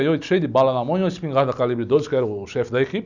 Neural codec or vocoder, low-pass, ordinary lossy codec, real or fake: none; 7.2 kHz; none; real